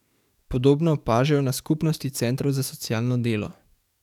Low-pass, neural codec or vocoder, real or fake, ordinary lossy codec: 19.8 kHz; codec, 44.1 kHz, 7.8 kbps, DAC; fake; none